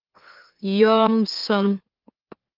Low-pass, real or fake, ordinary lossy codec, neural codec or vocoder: 5.4 kHz; fake; Opus, 24 kbps; autoencoder, 44.1 kHz, a latent of 192 numbers a frame, MeloTTS